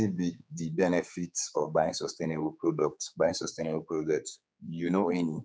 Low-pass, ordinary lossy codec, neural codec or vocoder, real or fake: none; none; codec, 16 kHz, 4 kbps, X-Codec, HuBERT features, trained on general audio; fake